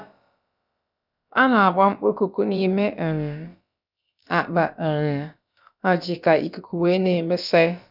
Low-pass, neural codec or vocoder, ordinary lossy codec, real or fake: 5.4 kHz; codec, 16 kHz, about 1 kbps, DyCAST, with the encoder's durations; none; fake